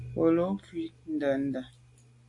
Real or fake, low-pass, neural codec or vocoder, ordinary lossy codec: real; 10.8 kHz; none; AAC, 48 kbps